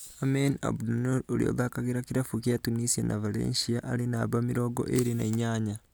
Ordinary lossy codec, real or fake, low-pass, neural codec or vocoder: none; real; none; none